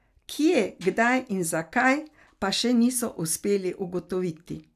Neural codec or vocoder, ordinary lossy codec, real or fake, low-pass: none; none; real; 14.4 kHz